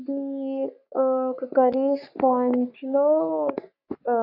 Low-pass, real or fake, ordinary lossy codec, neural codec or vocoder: 5.4 kHz; fake; none; codec, 44.1 kHz, 3.4 kbps, Pupu-Codec